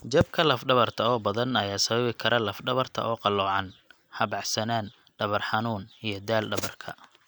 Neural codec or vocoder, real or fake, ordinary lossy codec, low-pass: none; real; none; none